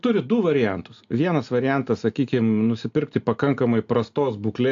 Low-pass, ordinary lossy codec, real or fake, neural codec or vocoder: 7.2 kHz; AAC, 64 kbps; real; none